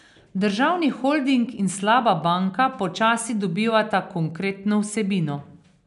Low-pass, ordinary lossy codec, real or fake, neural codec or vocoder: 10.8 kHz; none; real; none